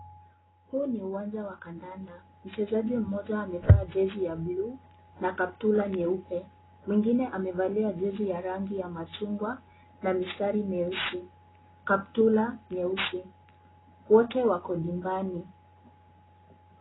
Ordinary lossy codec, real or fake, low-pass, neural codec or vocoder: AAC, 16 kbps; real; 7.2 kHz; none